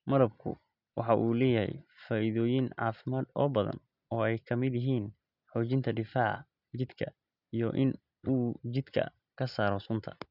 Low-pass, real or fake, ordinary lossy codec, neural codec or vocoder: 5.4 kHz; real; none; none